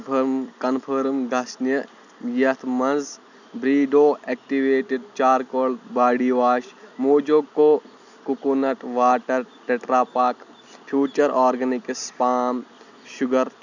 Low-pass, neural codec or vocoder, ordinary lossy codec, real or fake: 7.2 kHz; none; none; real